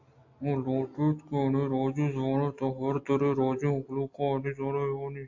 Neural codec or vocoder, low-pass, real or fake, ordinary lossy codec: none; 7.2 kHz; real; AAC, 48 kbps